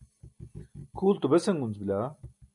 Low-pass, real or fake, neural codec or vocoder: 10.8 kHz; real; none